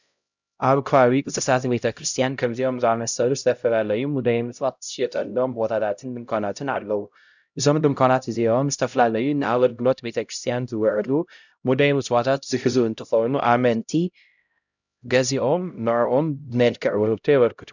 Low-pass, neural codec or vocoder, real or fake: 7.2 kHz; codec, 16 kHz, 0.5 kbps, X-Codec, HuBERT features, trained on LibriSpeech; fake